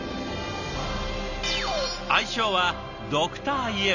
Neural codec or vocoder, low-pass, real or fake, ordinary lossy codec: none; 7.2 kHz; real; none